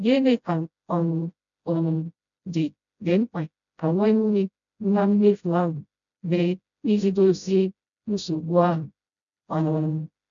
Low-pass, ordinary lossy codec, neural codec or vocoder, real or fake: 7.2 kHz; MP3, 96 kbps; codec, 16 kHz, 0.5 kbps, FreqCodec, smaller model; fake